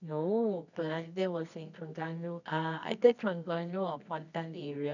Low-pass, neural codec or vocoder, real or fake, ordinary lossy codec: 7.2 kHz; codec, 24 kHz, 0.9 kbps, WavTokenizer, medium music audio release; fake; none